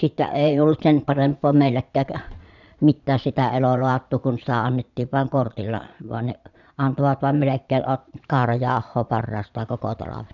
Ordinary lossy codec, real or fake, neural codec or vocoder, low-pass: none; fake; vocoder, 22.05 kHz, 80 mel bands, Vocos; 7.2 kHz